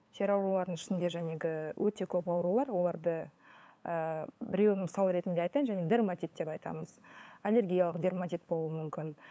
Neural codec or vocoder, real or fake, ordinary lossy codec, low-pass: codec, 16 kHz, 8 kbps, FunCodec, trained on LibriTTS, 25 frames a second; fake; none; none